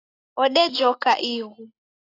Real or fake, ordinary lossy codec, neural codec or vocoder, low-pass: real; AAC, 24 kbps; none; 5.4 kHz